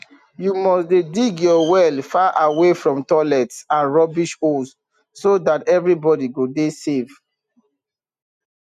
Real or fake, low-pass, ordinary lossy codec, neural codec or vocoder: real; 14.4 kHz; none; none